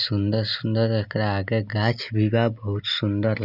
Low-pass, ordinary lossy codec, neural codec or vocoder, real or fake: 5.4 kHz; none; none; real